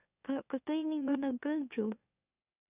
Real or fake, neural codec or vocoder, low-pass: fake; autoencoder, 44.1 kHz, a latent of 192 numbers a frame, MeloTTS; 3.6 kHz